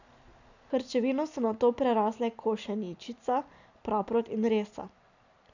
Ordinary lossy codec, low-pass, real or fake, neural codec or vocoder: none; 7.2 kHz; real; none